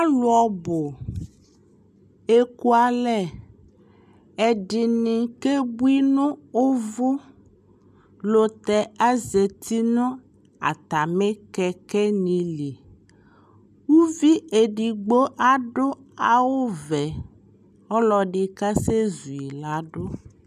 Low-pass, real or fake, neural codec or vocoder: 14.4 kHz; real; none